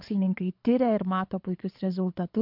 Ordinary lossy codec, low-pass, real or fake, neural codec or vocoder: MP3, 48 kbps; 5.4 kHz; fake; codec, 24 kHz, 6 kbps, HILCodec